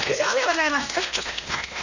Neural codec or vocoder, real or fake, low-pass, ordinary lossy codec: codec, 16 kHz, 1 kbps, X-Codec, WavLM features, trained on Multilingual LibriSpeech; fake; 7.2 kHz; none